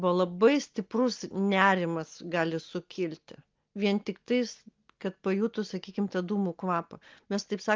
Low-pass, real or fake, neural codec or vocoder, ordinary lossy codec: 7.2 kHz; real; none; Opus, 32 kbps